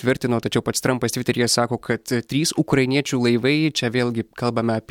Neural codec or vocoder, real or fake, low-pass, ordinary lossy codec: none; real; 19.8 kHz; MP3, 96 kbps